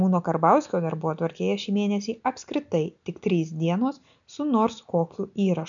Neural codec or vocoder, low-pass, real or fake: none; 7.2 kHz; real